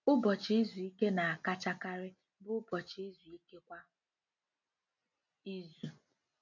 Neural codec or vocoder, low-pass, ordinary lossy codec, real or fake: none; 7.2 kHz; none; real